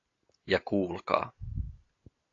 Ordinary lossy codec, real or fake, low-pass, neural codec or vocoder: AAC, 32 kbps; real; 7.2 kHz; none